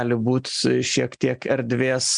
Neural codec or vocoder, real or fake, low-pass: none; real; 9.9 kHz